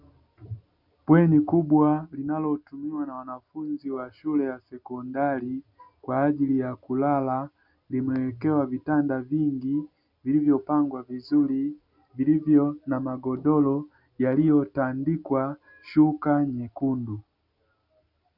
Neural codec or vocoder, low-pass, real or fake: none; 5.4 kHz; real